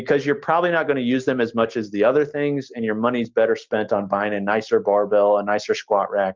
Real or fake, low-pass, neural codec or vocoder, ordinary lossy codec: real; 7.2 kHz; none; Opus, 32 kbps